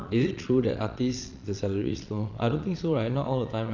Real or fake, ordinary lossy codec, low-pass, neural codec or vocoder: fake; none; 7.2 kHz; vocoder, 22.05 kHz, 80 mel bands, Vocos